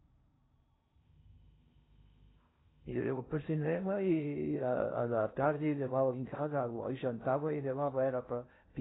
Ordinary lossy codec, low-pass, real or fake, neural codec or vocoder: AAC, 16 kbps; 7.2 kHz; fake; codec, 16 kHz in and 24 kHz out, 0.6 kbps, FocalCodec, streaming, 4096 codes